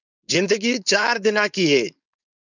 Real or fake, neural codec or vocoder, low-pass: fake; codec, 16 kHz, 4.8 kbps, FACodec; 7.2 kHz